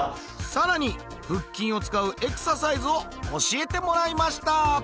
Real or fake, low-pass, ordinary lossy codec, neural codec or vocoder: real; none; none; none